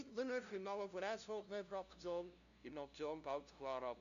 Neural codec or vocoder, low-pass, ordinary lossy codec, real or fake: codec, 16 kHz, 0.5 kbps, FunCodec, trained on LibriTTS, 25 frames a second; 7.2 kHz; AAC, 96 kbps; fake